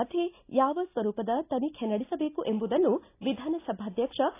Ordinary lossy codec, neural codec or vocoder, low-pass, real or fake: AAC, 24 kbps; none; 3.6 kHz; real